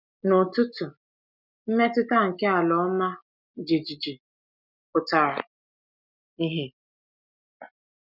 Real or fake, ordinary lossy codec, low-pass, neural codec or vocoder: real; none; 5.4 kHz; none